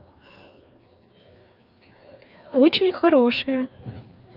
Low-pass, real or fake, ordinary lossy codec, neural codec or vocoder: 5.4 kHz; fake; none; codec, 16 kHz, 2 kbps, FreqCodec, larger model